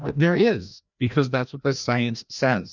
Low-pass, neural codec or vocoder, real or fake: 7.2 kHz; codec, 16 kHz, 1 kbps, FreqCodec, larger model; fake